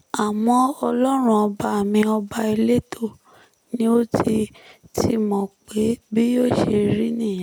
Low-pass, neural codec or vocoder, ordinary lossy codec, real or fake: none; none; none; real